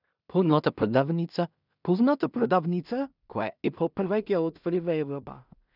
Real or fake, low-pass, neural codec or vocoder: fake; 5.4 kHz; codec, 16 kHz in and 24 kHz out, 0.4 kbps, LongCat-Audio-Codec, two codebook decoder